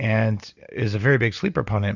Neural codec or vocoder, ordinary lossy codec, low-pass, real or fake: none; Opus, 64 kbps; 7.2 kHz; real